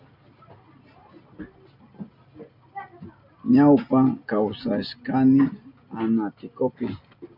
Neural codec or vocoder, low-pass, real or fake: none; 5.4 kHz; real